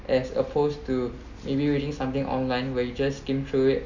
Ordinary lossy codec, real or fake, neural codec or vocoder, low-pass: none; real; none; 7.2 kHz